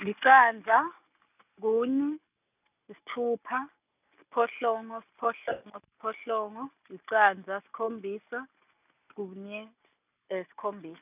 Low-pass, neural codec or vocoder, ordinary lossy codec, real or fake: 3.6 kHz; none; none; real